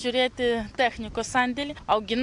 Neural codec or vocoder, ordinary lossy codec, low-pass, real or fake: none; AAC, 64 kbps; 10.8 kHz; real